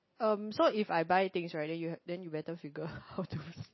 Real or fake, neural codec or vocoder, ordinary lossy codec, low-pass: real; none; MP3, 24 kbps; 7.2 kHz